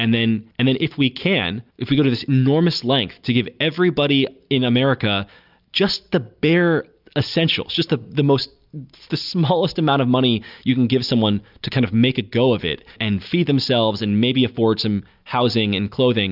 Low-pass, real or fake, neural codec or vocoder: 5.4 kHz; real; none